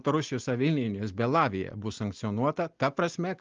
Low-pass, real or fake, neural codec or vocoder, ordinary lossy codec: 7.2 kHz; real; none; Opus, 32 kbps